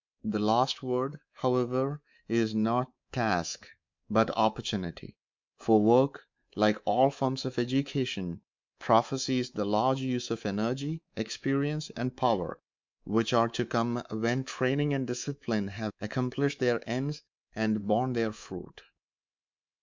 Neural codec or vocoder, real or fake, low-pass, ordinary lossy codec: codec, 24 kHz, 3.1 kbps, DualCodec; fake; 7.2 kHz; MP3, 64 kbps